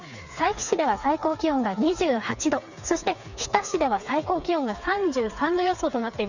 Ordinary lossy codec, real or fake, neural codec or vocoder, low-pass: none; fake; codec, 16 kHz, 4 kbps, FreqCodec, smaller model; 7.2 kHz